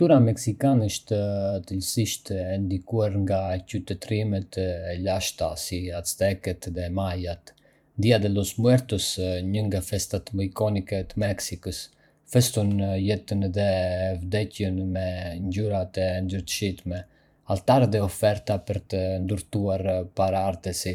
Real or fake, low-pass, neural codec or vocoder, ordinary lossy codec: fake; 19.8 kHz; vocoder, 44.1 kHz, 128 mel bands every 512 samples, BigVGAN v2; none